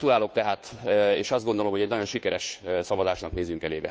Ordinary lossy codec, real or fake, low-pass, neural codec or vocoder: none; fake; none; codec, 16 kHz, 2 kbps, FunCodec, trained on Chinese and English, 25 frames a second